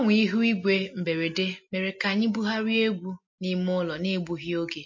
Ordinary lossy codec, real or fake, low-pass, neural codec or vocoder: MP3, 32 kbps; real; 7.2 kHz; none